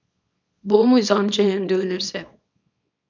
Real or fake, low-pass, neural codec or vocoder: fake; 7.2 kHz; codec, 24 kHz, 0.9 kbps, WavTokenizer, small release